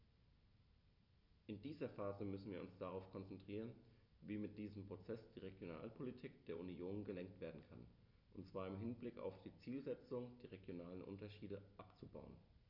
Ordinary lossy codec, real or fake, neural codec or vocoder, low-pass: none; real; none; 5.4 kHz